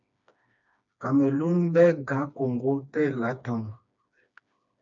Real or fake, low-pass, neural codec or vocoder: fake; 7.2 kHz; codec, 16 kHz, 2 kbps, FreqCodec, smaller model